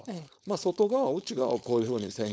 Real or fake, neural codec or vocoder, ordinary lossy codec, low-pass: fake; codec, 16 kHz, 4.8 kbps, FACodec; none; none